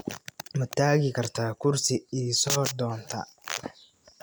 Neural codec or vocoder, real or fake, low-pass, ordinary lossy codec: none; real; none; none